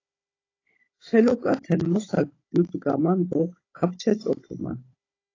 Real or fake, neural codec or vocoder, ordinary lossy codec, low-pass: fake; codec, 16 kHz, 16 kbps, FunCodec, trained on Chinese and English, 50 frames a second; AAC, 32 kbps; 7.2 kHz